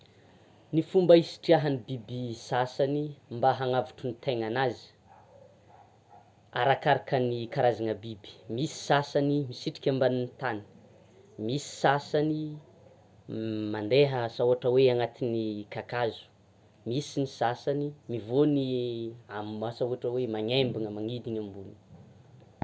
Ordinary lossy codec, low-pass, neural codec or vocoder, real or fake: none; none; none; real